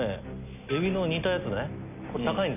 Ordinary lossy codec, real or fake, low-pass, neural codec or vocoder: none; real; 3.6 kHz; none